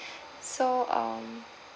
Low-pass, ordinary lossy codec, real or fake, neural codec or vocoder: none; none; real; none